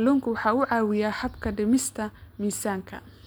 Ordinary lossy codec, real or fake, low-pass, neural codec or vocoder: none; real; none; none